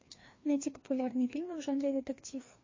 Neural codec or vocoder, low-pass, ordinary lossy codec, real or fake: codec, 16 kHz, 2 kbps, FreqCodec, larger model; 7.2 kHz; MP3, 32 kbps; fake